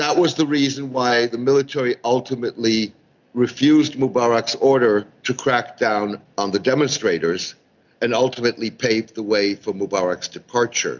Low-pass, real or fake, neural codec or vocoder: 7.2 kHz; real; none